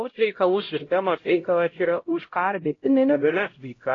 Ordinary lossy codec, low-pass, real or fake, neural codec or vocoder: AAC, 32 kbps; 7.2 kHz; fake; codec, 16 kHz, 0.5 kbps, X-Codec, HuBERT features, trained on LibriSpeech